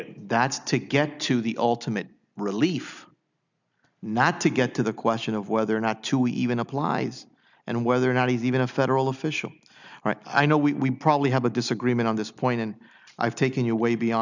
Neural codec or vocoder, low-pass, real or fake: none; 7.2 kHz; real